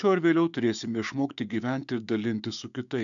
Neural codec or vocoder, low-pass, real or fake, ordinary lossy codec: codec, 16 kHz, 6 kbps, DAC; 7.2 kHz; fake; MP3, 96 kbps